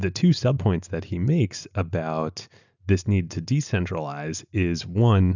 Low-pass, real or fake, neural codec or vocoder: 7.2 kHz; real; none